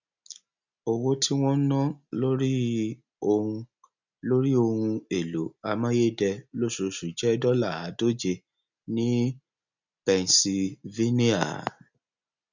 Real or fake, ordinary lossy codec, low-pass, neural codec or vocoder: real; none; 7.2 kHz; none